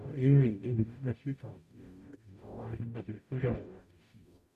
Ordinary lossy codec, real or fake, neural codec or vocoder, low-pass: none; fake; codec, 44.1 kHz, 0.9 kbps, DAC; 14.4 kHz